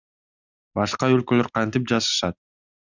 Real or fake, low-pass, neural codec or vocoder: real; 7.2 kHz; none